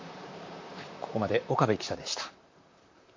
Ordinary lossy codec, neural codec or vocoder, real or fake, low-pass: MP3, 48 kbps; vocoder, 44.1 kHz, 128 mel bands, Pupu-Vocoder; fake; 7.2 kHz